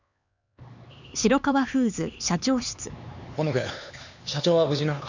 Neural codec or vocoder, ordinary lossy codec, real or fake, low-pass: codec, 16 kHz, 4 kbps, X-Codec, HuBERT features, trained on LibriSpeech; none; fake; 7.2 kHz